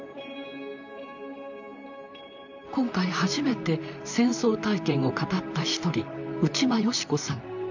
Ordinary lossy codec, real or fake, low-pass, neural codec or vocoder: none; fake; 7.2 kHz; vocoder, 44.1 kHz, 128 mel bands, Pupu-Vocoder